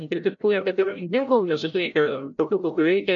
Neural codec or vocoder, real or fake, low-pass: codec, 16 kHz, 0.5 kbps, FreqCodec, larger model; fake; 7.2 kHz